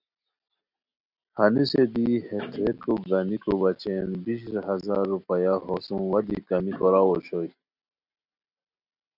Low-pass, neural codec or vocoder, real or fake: 5.4 kHz; none; real